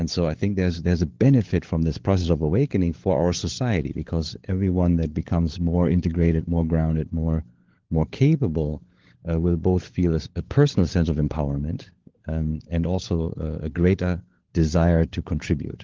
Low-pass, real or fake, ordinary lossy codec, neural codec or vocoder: 7.2 kHz; fake; Opus, 16 kbps; codec, 16 kHz, 16 kbps, FunCodec, trained on LibriTTS, 50 frames a second